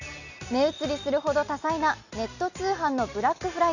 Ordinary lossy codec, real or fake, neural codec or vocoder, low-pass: none; real; none; 7.2 kHz